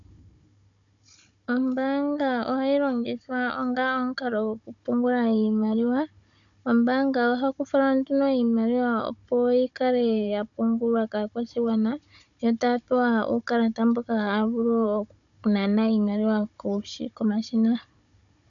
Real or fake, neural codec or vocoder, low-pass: fake; codec, 16 kHz, 16 kbps, FunCodec, trained on Chinese and English, 50 frames a second; 7.2 kHz